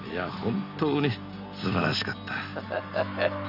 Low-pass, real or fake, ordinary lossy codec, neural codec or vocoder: 5.4 kHz; real; none; none